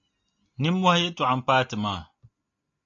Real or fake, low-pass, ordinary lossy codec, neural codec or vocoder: real; 7.2 kHz; AAC, 48 kbps; none